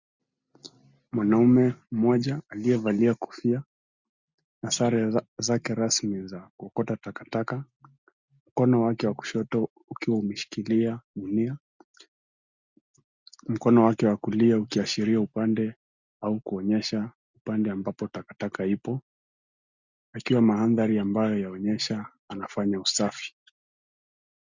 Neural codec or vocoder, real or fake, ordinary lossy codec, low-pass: none; real; Opus, 64 kbps; 7.2 kHz